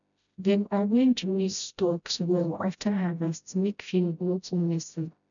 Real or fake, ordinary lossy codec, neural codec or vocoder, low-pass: fake; none; codec, 16 kHz, 0.5 kbps, FreqCodec, smaller model; 7.2 kHz